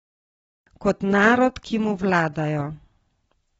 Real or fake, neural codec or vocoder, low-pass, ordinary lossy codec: real; none; 19.8 kHz; AAC, 24 kbps